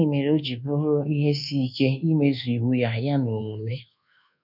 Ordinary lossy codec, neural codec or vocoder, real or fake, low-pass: none; codec, 24 kHz, 1.2 kbps, DualCodec; fake; 5.4 kHz